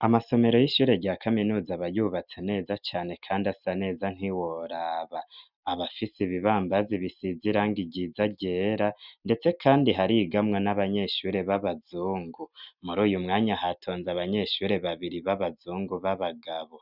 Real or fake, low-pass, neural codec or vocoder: real; 5.4 kHz; none